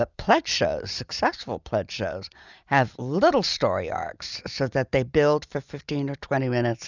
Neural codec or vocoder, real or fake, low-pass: none; real; 7.2 kHz